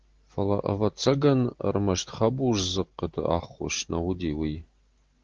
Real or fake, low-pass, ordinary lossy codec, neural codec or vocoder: real; 7.2 kHz; Opus, 16 kbps; none